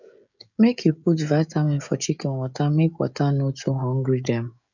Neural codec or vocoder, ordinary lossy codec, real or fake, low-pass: codec, 16 kHz, 16 kbps, FreqCodec, smaller model; none; fake; 7.2 kHz